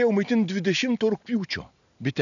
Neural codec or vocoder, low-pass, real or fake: none; 7.2 kHz; real